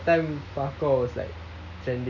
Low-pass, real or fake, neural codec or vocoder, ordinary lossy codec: 7.2 kHz; real; none; none